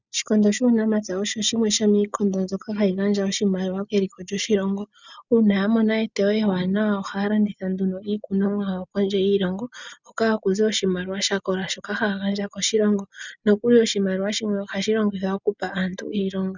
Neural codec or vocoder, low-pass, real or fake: none; 7.2 kHz; real